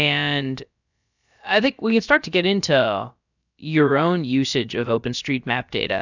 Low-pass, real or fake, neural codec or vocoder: 7.2 kHz; fake; codec, 16 kHz, about 1 kbps, DyCAST, with the encoder's durations